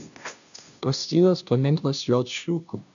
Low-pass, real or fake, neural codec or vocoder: 7.2 kHz; fake; codec, 16 kHz, 0.5 kbps, FunCodec, trained on Chinese and English, 25 frames a second